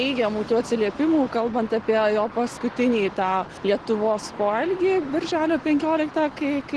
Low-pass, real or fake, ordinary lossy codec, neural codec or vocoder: 10.8 kHz; real; Opus, 16 kbps; none